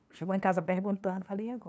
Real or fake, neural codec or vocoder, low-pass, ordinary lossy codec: fake; codec, 16 kHz, 2 kbps, FunCodec, trained on LibriTTS, 25 frames a second; none; none